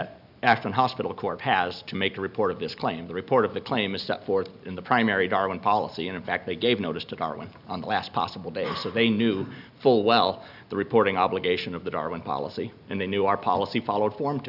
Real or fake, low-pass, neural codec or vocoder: real; 5.4 kHz; none